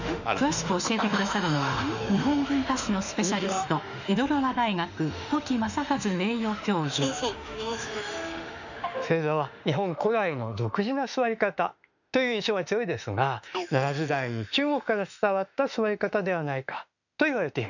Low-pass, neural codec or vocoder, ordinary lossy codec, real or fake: 7.2 kHz; autoencoder, 48 kHz, 32 numbers a frame, DAC-VAE, trained on Japanese speech; none; fake